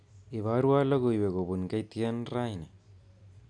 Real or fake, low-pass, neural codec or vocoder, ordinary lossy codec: real; 9.9 kHz; none; none